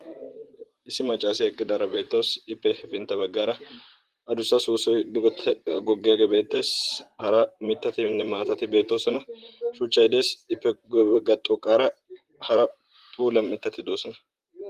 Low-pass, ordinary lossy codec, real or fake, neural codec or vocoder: 14.4 kHz; Opus, 24 kbps; fake; vocoder, 44.1 kHz, 128 mel bands, Pupu-Vocoder